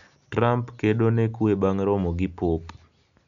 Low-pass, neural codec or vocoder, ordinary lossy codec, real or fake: 7.2 kHz; none; none; real